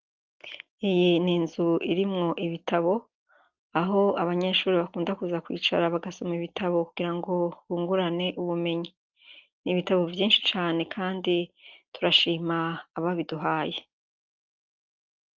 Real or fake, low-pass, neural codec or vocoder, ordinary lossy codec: real; 7.2 kHz; none; Opus, 24 kbps